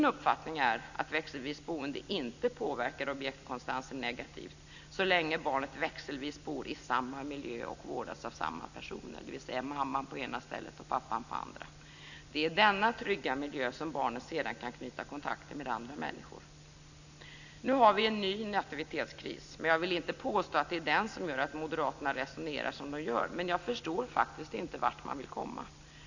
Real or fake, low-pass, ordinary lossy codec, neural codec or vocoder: real; 7.2 kHz; none; none